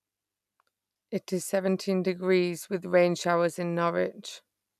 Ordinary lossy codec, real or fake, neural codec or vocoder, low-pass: none; real; none; 14.4 kHz